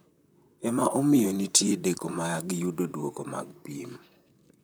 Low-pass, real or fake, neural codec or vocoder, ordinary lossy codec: none; fake; vocoder, 44.1 kHz, 128 mel bands, Pupu-Vocoder; none